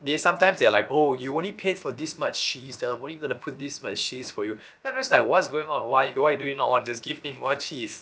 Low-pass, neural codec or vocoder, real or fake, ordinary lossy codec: none; codec, 16 kHz, about 1 kbps, DyCAST, with the encoder's durations; fake; none